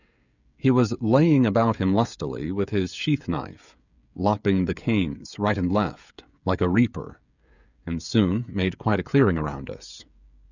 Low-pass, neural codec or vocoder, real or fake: 7.2 kHz; codec, 16 kHz, 16 kbps, FreqCodec, smaller model; fake